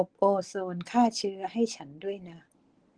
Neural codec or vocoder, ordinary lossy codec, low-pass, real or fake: none; Opus, 16 kbps; 9.9 kHz; real